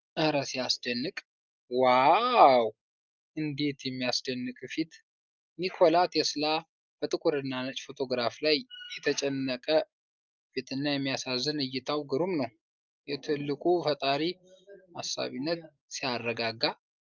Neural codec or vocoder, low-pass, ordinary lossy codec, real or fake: none; 7.2 kHz; Opus, 24 kbps; real